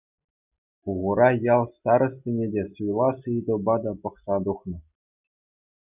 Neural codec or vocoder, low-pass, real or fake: none; 3.6 kHz; real